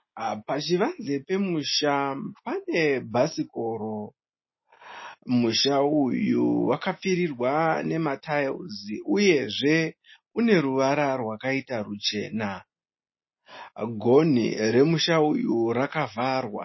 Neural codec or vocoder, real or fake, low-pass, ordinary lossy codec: none; real; 7.2 kHz; MP3, 24 kbps